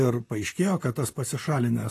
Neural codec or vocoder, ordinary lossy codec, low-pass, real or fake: vocoder, 44.1 kHz, 128 mel bands, Pupu-Vocoder; AAC, 48 kbps; 14.4 kHz; fake